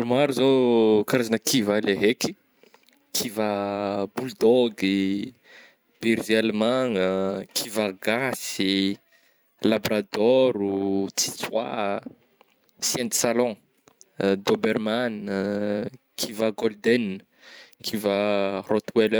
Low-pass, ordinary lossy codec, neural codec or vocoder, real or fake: none; none; vocoder, 44.1 kHz, 128 mel bands every 512 samples, BigVGAN v2; fake